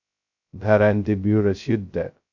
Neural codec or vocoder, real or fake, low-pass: codec, 16 kHz, 0.2 kbps, FocalCodec; fake; 7.2 kHz